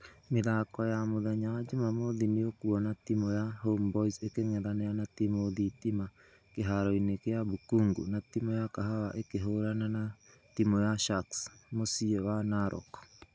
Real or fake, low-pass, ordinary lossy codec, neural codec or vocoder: real; none; none; none